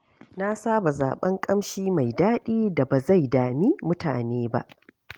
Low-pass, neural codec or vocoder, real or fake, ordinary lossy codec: 19.8 kHz; none; real; Opus, 24 kbps